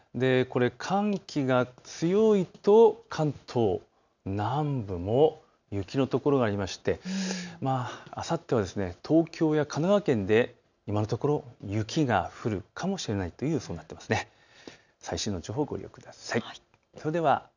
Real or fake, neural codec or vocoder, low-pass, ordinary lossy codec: real; none; 7.2 kHz; none